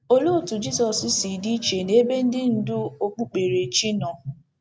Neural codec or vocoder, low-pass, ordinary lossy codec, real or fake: none; none; none; real